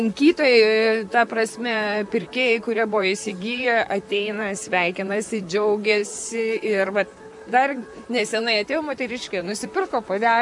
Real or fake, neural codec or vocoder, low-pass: fake; vocoder, 44.1 kHz, 128 mel bands, Pupu-Vocoder; 10.8 kHz